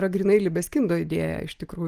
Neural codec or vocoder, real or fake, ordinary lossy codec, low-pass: none; real; Opus, 24 kbps; 14.4 kHz